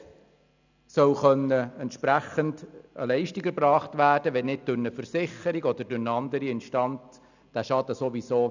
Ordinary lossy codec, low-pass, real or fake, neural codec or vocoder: none; 7.2 kHz; real; none